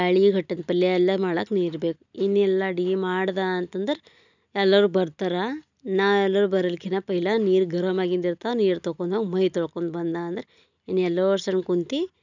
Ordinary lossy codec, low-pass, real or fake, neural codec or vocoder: none; 7.2 kHz; real; none